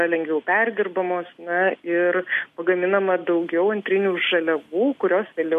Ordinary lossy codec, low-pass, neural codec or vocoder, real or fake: MP3, 64 kbps; 14.4 kHz; none; real